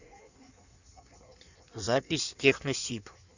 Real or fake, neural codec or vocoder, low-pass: fake; codec, 16 kHz in and 24 kHz out, 1.1 kbps, FireRedTTS-2 codec; 7.2 kHz